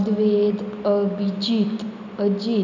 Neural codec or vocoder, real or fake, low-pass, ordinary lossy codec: none; real; 7.2 kHz; none